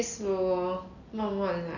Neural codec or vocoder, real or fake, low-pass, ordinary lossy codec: none; real; 7.2 kHz; none